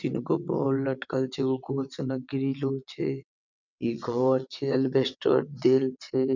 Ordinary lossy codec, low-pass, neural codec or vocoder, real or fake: none; 7.2 kHz; vocoder, 22.05 kHz, 80 mel bands, WaveNeXt; fake